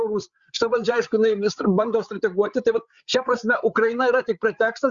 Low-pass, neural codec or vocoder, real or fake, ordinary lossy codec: 7.2 kHz; codec, 16 kHz, 8 kbps, FreqCodec, larger model; fake; Opus, 64 kbps